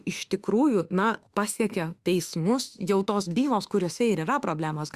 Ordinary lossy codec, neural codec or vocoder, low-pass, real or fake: Opus, 64 kbps; autoencoder, 48 kHz, 32 numbers a frame, DAC-VAE, trained on Japanese speech; 14.4 kHz; fake